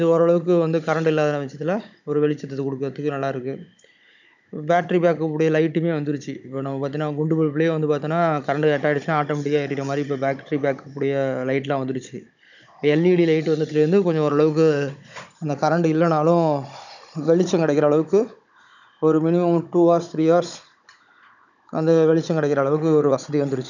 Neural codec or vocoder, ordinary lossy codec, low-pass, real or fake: codec, 16 kHz, 16 kbps, FunCodec, trained on Chinese and English, 50 frames a second; none; 7.2 kHz; fake